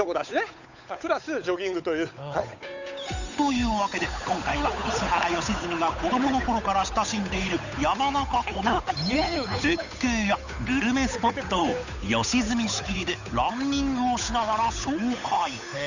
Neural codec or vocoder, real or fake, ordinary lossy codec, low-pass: codec, 16 kHz, 8 kbps, FunCodec, trained on Chinese and English, 25 frames a second; fake; none; 7.2 kHz